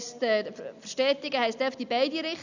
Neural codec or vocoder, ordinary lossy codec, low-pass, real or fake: none; none; 7.2 kHz; real